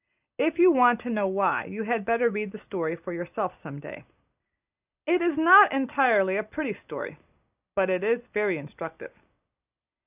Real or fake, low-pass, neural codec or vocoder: real; 3.6 kHz; none